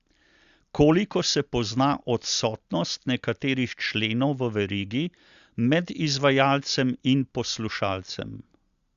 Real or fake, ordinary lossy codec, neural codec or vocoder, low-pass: real; Opus, 64 kbps; none; 7.2 kHz